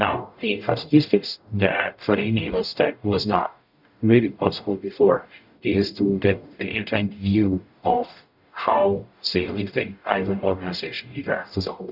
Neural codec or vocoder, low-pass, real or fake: codec, 44.1 kHz, 0.9 kbps, DAC; 5.4 kHz; fake